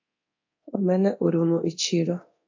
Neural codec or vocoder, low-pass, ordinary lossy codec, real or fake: codec, 24 kHz, 0.9 kbps, DualCodec; 7.2 kHz; AAC, 48 kbps; fake